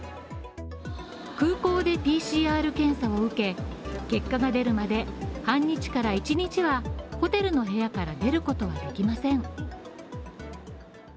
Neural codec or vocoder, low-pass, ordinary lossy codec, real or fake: none; none; none; real